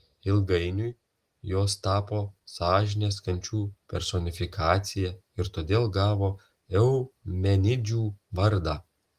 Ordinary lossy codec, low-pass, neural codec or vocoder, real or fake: Opus, 24 kbps; 14.4 kHz; none; real